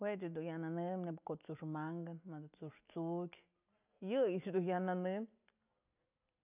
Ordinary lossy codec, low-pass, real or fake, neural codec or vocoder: none; 3.6 kHz; real; none